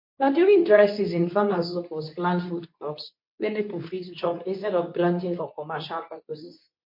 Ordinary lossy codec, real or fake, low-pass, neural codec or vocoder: MP3, 32 kbps; fake; 5.4 kHz; codec, 24 kHz, 0.9 kbps, WavTokenizer, medium speech release version 2